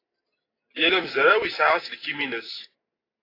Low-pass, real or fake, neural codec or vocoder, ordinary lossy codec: 5.4 kHz; real; none; AAC, 24 kbps